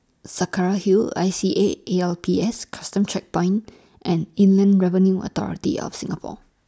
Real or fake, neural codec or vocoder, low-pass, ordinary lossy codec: real; none; none; none